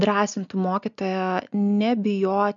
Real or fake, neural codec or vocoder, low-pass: real; none; 7.2 kHz